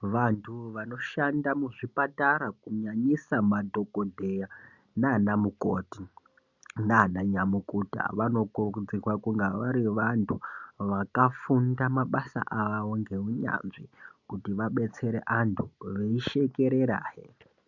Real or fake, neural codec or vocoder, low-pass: real; none; 7.2 kHz